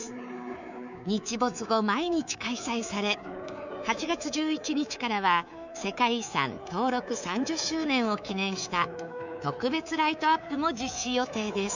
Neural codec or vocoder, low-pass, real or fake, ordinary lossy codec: codec, 24 kHz, 3.1 kbps, DualCodec; 7.2 kHz; fake; none